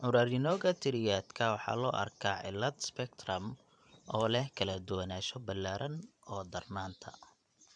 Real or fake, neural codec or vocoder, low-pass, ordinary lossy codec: fake; vocoder, 44.1 kHz, 128 mel bands every 512 samples, BigVGAN v2; 9.9 kHz; none